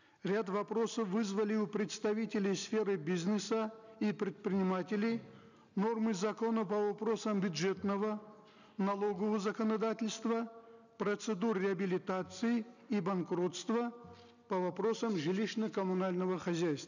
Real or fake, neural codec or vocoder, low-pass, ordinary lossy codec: real; none; 7.2 kHz; none